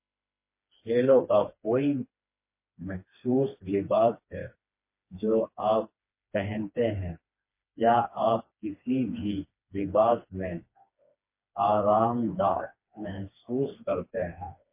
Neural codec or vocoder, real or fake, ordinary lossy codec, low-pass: codec, 16 kHz, 2 kbps, FreqCodec, smaller model; fake; MP3, 24 kbps; 3.6 kHz